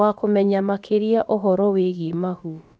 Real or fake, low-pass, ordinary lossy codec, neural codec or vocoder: fake; none; none; codec, 16 kHz, about 1 kbps, DyCAST, with the encoder's durations